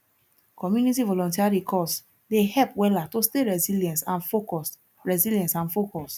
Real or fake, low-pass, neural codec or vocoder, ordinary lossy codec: real; none; none; none